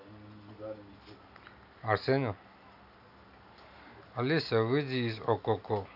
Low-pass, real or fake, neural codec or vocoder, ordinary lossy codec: 5.4 kHz; real; none; none